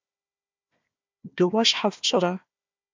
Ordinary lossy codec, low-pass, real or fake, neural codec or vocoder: MP3, 64 kbps; 7.2 kHz; fake; codec, 16 kHz, 1 kbps, FunCodec, trained on Chinese and English, 50 frames a second